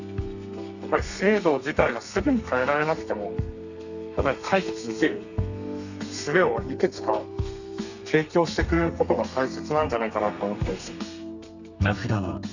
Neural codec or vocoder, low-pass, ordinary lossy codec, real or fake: codec, 32 kHz, 1.9 kbps, SNAC; 7.2 kHz; none; fake